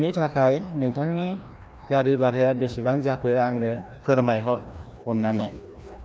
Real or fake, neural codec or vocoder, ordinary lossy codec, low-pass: fake; codec, 16 kHz, 1 kbps, FreqCodec, larger model; none; none